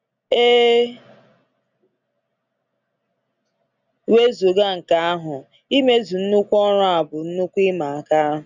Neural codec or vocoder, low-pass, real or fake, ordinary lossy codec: none; 7.2 kHz; real; none